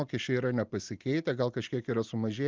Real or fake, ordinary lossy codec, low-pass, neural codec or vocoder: real; Opus, 32 kbps; 7.2 kHz; none